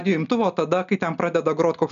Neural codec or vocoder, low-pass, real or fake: none; 7.2 kHz; real